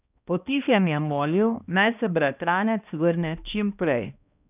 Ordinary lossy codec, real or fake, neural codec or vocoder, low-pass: none; fake; codec, 16 kHz, 1 kbps, X-Codec, HuBERT features, trained on balanced general audio; 3.6 kHz